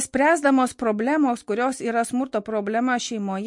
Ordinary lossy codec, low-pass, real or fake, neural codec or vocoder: MP3, 48 kbps; 10.8 kHz; real; none